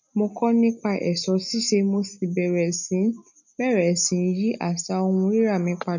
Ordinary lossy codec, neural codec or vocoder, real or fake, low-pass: none; none; real; 7.2 kHz